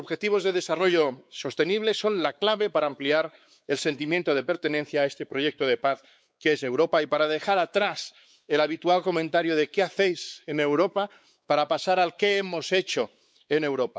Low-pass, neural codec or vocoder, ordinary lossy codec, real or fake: none; codec, 16 kHz, 4 kbps, X-Codec, WavLM features, trained on Multilingual LibriSpeech; none; fake